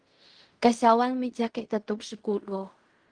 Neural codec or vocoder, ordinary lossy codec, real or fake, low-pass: codec, 16 kHz in and 24 kHz out, 0.4 kbps, LongCat-Audio-Codec, fine tuned four codebook decoder; Opus, 24 kbps; fake; 9.9 kHz